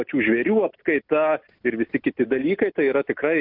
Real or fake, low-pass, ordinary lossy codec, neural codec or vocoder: real; 5.4 kHz; AAC, 48 kbps; none